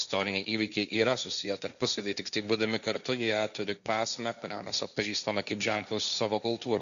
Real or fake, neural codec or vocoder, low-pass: fake; codec, 16 kHz, 1.1 kbps, Voila-Tokenizer; 7.2 kHz